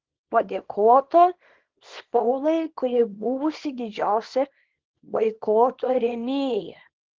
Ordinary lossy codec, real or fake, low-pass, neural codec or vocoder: Opus, 16 kbps; fake; 7.2 kHz; codec, 24 kHz, 0.9 kbps, WavTokenizer, small release